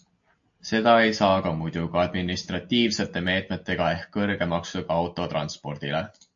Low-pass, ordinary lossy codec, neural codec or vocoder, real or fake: 7.2 kHz; AAC, 64 kbps; none; real